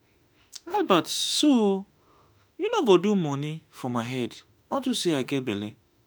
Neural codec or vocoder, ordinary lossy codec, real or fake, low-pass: autoencoder, 48 kHz, 32 numbers a frame, DAC-VAE, trained on Japanese speech; none; fake; none